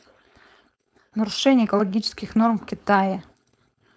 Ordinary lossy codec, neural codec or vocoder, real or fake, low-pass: none; codec, 16 kHz, 4.8 kbps, FACodec; fake; none